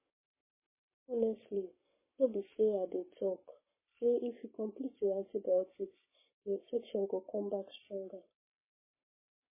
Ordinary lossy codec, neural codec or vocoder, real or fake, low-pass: MP3, 16 kbps; codec, 44.1 kHz, 7.8 kbps, Pupu-Codec; fake; 3.6 kHz